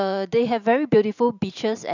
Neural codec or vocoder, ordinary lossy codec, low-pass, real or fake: vocoder, 44.1 kHz, 128 mel bands every 512 samples, BigVGAN v2; none; 7.2 kHz; fake